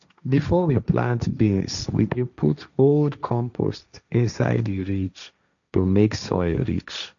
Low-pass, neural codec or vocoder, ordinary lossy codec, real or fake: 7.2 kHz; codec, 16 kHz, 1.1 kbps, Voila-Tokenizer; none; fake